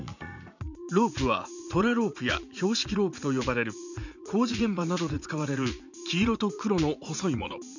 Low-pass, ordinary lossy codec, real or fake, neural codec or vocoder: 7.2 kHz; none; fake; vocoder, 44.1 kHz, 80 mel bands, Vocos